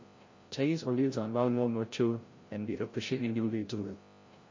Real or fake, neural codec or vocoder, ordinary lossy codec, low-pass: fake; codec, 16 kHz, 0.5 kbps, FreqCodec, larger model; MP3, 32 kbps; 7.2 kHz